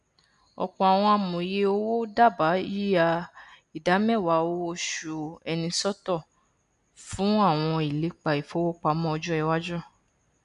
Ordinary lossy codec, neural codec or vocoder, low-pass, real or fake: none; none; 10.8 kHz; real